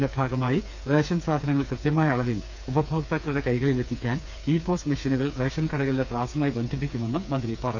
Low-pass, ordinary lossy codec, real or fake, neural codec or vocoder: none; none; fake; codec, 16 kHz, 4 kbps, FreqCodec, smaller model